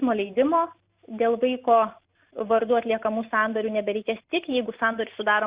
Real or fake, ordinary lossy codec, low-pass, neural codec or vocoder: real; Opus, 64 kbps; 3.6 kHz; none